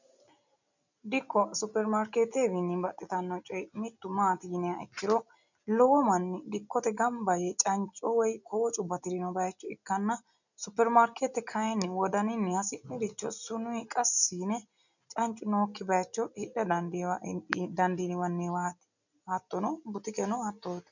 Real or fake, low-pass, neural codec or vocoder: real; 7.2 kHz; none